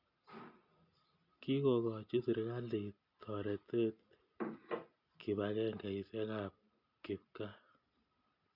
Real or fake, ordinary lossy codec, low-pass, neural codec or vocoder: real; none; 5.4 kHz; none